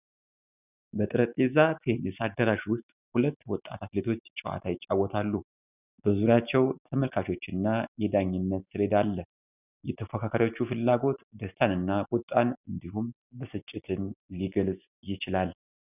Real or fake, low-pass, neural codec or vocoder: real; 3.6 kHz; none